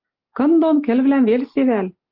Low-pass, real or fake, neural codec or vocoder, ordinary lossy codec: 5.4 kHz; real; none; Opus, 32 kbps